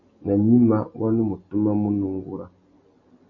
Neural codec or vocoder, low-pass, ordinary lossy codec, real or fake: none; 7.2 kHz; MP3, 32 kbps; real